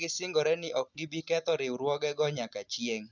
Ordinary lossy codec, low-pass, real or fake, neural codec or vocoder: none; 7.2 kHz; real; none